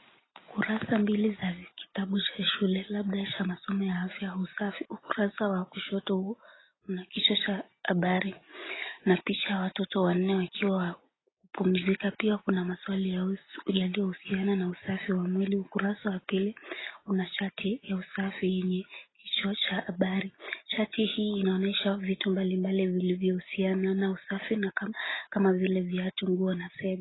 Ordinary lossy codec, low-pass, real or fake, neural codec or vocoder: AAC, 16 kbps; 7.2 kHz; real; none